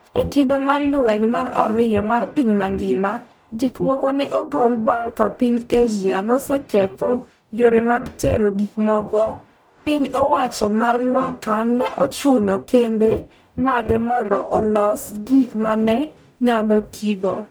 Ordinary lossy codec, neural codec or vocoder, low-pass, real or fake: none; codec, 44.1 kHz, 0.9 kbps, DAC; none; fake